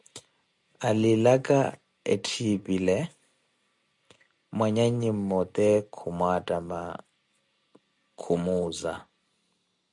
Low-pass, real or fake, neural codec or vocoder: 10.8 kHz; real; none